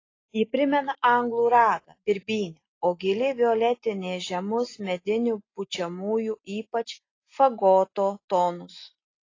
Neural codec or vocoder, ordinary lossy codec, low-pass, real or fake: none; AAC, 32 kbps; 7.2 kHz; real